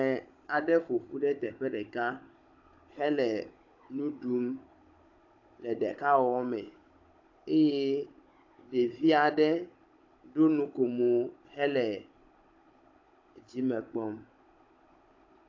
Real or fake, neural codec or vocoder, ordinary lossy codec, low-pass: fake; codec, 16 kHz, 16 kbps, FunCodec, trained on Chinese and English, 50 frames a second; AAC, 48 kbps; 7.2 kHz